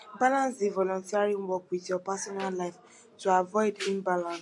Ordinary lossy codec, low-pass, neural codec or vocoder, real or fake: MP3, 48 kbps; 10.8 kHz; none; real